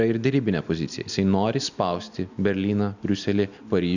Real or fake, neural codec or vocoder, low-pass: real; none; 7.2 kHz